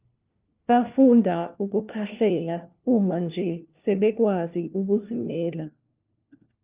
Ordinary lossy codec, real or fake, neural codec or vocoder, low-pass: Opus, 32 kbps; fake; codec, 16 kHz, 1 kbps, FunCodec, trained on LibriTTS, 50 frames a second; 3.6 kHz